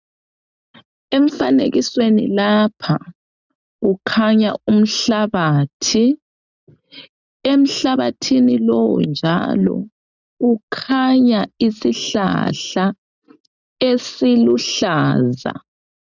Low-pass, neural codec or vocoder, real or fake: 7.2 kHz; none; real